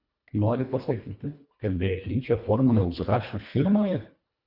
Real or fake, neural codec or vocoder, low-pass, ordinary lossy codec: fake; codec, 24 kHz, 1.5 kbps, HILCodec; 5.4 kHz; Opus, 64 kbps